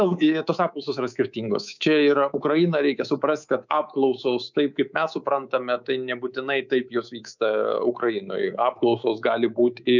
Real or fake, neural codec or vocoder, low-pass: fake; codec, 24 kHz, 3.1 kbps, DualCodec; 7.2 kHz